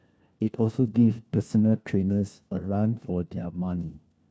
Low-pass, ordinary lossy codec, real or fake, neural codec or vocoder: none; none; fake; codec, 16 kHz, 1 kbps, FunCodec, trained on LibriTTS, 50 frames a second